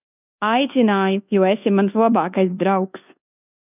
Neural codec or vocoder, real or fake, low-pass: codec, 24 kHz, 1.2 kbps, DualCodec; fake; 3.6 kHz